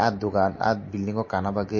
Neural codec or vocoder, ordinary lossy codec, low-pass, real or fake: none; MP3, 32 kbps; 7.2 kHz; real